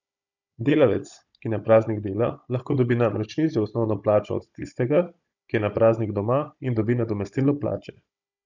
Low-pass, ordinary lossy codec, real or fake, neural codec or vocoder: 7.2 kHz; none; fake; codec, 16 kHz, 16 kbps, FunCodec, trained on Chinese and English, 50 frames a second